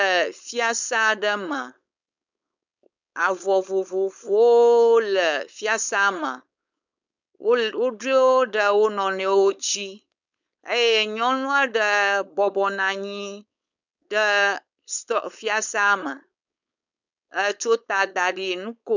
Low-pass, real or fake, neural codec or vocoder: 7.2 kHz; fake; codec, 16 kHz, 4.8 kbps, FACodec